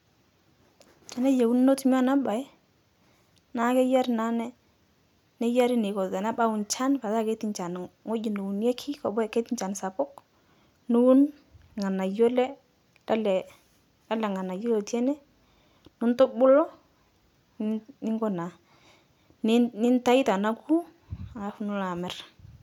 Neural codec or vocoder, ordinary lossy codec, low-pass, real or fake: none; none; 19.8 kHz; real